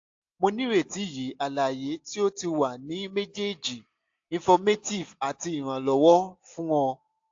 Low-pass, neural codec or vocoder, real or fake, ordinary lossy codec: 7.2 kHz; none; real; AAC, 48 kbps